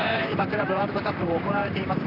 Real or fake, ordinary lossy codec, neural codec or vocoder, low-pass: fake; none; vocoder, 44.1 kHz, 128 mel bands, Pupu-Vocoder; 5.4 kHz